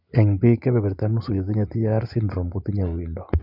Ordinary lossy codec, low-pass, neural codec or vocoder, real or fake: none; 5.4 kHz; vocoder, 24 kHz, 100 mel bands, Vocos; fake